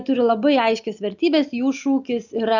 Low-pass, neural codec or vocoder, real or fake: 7.2 kHz; none; real